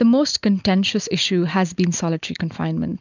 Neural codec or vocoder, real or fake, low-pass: none; real; 7.2 kHz